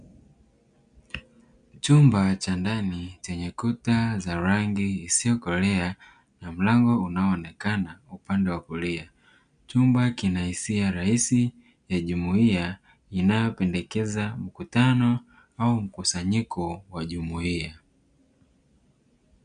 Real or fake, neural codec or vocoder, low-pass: real; none; 9.9 kHz